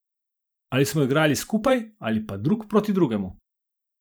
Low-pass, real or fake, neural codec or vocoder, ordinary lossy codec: none; fake; vocoder, 44.1 kHz, 128 mel bands every 512 samples, BigVGAN v2; none